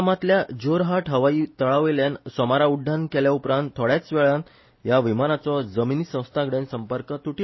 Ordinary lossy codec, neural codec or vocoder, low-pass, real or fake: MP3, 24 kbps; none; 7.2 kHz; real